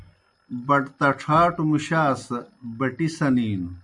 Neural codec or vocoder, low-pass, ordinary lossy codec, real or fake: none; 10.8 kHz; MP3, 96 kbps; real